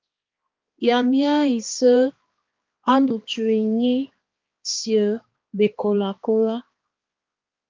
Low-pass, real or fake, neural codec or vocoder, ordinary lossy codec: 7.2 kHz; fake; codec, 16 kHz, 2 kbps, X-Codec, HuBERT features, trained on balanced general audio; Opus, 32 kbps